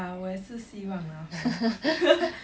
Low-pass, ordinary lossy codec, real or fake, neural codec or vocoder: none; none; real; none